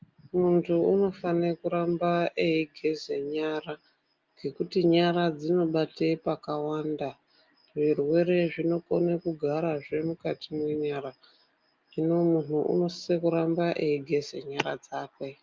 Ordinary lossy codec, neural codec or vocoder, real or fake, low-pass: Opus, 24 kbps; none; real; 7.2 kHz